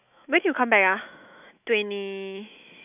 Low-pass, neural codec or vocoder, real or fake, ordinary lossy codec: 3.6 kHz; autoencoder, 48 kHz, 128 numbers a frame, DAC-VAE, trained on Japanese speech; fake; none